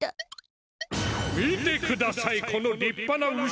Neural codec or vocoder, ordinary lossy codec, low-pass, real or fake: none; none; none; real